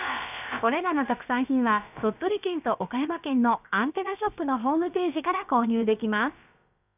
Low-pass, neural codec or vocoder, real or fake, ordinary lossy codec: 3.6 kHz; codec, 16 kHz, about 1 kbps, DyCAST, with the encoder's durations; fake; none